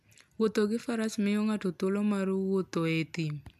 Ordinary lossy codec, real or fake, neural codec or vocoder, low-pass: none; real; none; 14.4 kHz